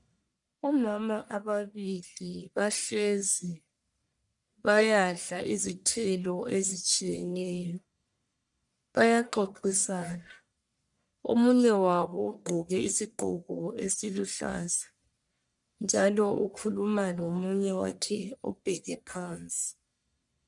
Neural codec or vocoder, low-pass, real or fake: codec, 44.1 kHz, 1.7 kbps, Pupu-Codec; 10.8 kHz; fake